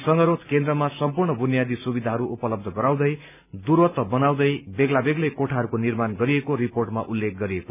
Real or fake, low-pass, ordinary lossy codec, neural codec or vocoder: real; 3.6 kHz; none; none